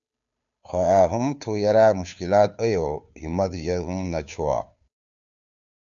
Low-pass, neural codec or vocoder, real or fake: 7.2 kHz; codec, 16 kHz, 2 kbps, FunCodec, trained on Chinese and English, 25 frames a second; fake